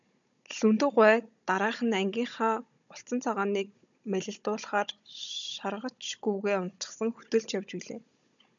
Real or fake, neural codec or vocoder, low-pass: fake; codec, 16 kHz, 16 kbps, FunCodec, trained on Chinese and English, 50 frames a second; 7.2 kHz